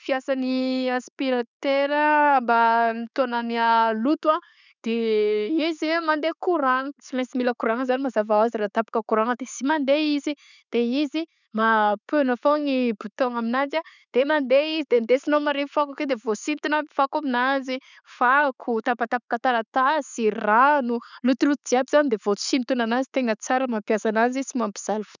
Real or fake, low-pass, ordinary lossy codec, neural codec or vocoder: real; 7.2 kHz; none; none